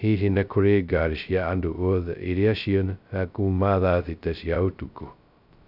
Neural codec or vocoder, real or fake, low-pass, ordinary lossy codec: codec, 16 kHz, 0.2 kbps, FocalCodec; fake; 5.4 kHz; none